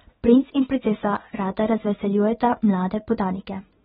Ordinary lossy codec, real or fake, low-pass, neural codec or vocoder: AAC, 16 kbps; real; 9.9 kHz; none